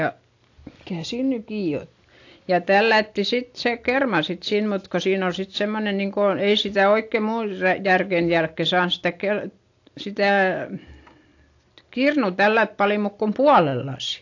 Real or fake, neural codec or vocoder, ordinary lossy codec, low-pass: real; none; AAC, 48 kbps; 7.2 kHz